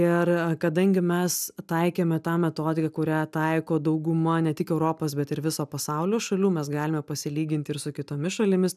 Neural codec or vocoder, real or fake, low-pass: none; real; 14.4 kHz